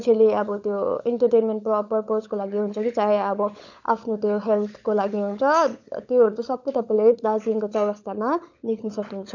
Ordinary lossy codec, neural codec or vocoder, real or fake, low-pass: none; codec, 16 kHz, 16 kbps, FunCodec, trained on LibriTTS, 50 frames a second; fake; 7.2 kHz